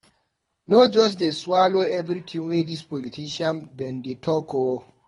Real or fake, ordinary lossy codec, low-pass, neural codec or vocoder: fake; AAC, 32 kbps; 10.8 kHz; codec, 24 kHz, 3 kbps, HILCodec